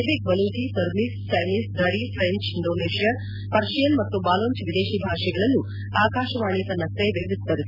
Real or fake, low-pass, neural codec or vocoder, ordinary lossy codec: real; 5.4 kHz; none; none